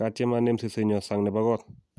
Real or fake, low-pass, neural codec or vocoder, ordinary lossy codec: real; none; none; none